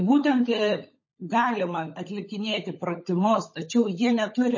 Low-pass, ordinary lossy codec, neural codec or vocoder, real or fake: 7.2 kHz; MP3, 32 kbps; codec, 16 kHz, 16 kbps, FunCodec, trained on LibriTTS, 50 frames a second; fake